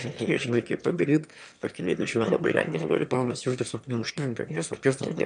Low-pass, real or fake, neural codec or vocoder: 9.9 kHz; fake; autoencoder, 22.05 kHz, a latent of 192 numbers a frame, VITS, trained on one speaker